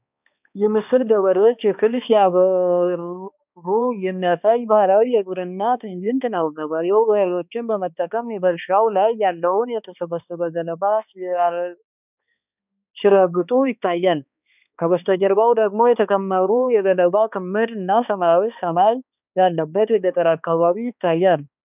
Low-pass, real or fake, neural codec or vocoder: 3.6 kHz; fake; codec, 16 kHz, 2 kbps, X-Codec, HuBERT features, trained on balanced general audio